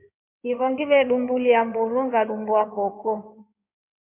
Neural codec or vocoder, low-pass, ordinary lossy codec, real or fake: codec, 16 kHz in and 24 kHz out, 2.2 kbps, FireRedTTS-2 codec; 3.6 kHz; MP3, 32 kbps; fake